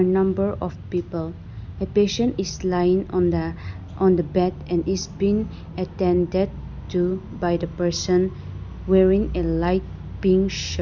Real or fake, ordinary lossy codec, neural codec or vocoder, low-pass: real; none; none; 7.2 kHz